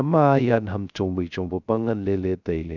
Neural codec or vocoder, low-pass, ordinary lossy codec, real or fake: codec, 16 kHz, 0.3 kbps, FocalCodec; 7.2 kHz; none; fake